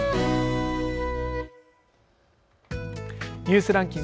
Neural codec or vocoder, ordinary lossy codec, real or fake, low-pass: none; none; real; none